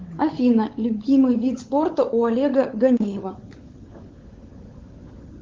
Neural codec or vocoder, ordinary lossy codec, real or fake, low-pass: codec, 16 kHz, 16 kbps, FunCodec, trained on LibriTTS, 50 frames a second; Opus, 16 kbps; fake; 7.2 kHz